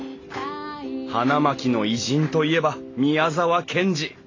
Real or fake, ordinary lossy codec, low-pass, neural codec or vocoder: real; AAC, 32 kbps; 7.2 kHz; none